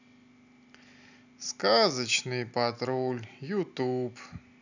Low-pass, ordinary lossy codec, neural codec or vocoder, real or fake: 7.2 kHz; none; none; real